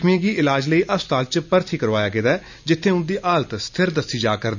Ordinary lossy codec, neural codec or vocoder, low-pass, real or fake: none; none; 7.2 kHz; real